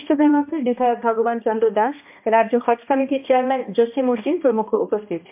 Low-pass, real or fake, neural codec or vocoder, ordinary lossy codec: 3.6 kHz; fake; codec, 16 kHz, 1 kbps, X-Codec, HuBERT features, trained on balanced general audio; MP3, 32 kbps